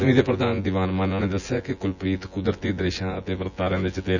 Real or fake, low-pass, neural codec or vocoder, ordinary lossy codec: fake; 7.2 kHz; vocoder, 24 kHz, 100 mel bands, Vocos; none